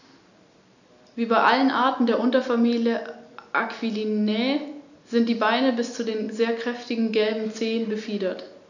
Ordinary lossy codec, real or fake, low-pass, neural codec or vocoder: none; real; 7.2 kHz; none